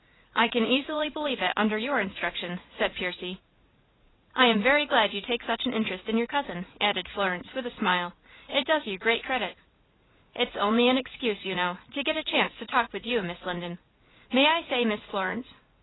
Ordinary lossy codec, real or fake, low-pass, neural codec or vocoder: AAC, 16 kbps; fake; 7.2 kHz; vocoder, 44.1 kHz, 80 mel bands, Vocos